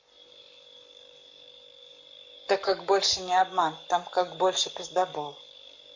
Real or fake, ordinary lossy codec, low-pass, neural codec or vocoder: fake; MP3, 48 kbps; 7.2 kHz; codec, 44.1 kHz, 7.8 kbps, Pupu-Codec